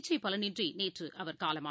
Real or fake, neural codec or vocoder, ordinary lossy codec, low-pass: real; none; none; none